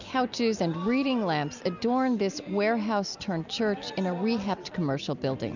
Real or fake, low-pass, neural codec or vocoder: real; 7.2 kHz; none